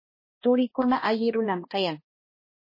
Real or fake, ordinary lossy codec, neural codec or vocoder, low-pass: fake; MP3, 24 kbps; codec, 16 kHz, 1 kbps, X-Codec, HuBERT features, trained on balanced general audio; 5.4 kHz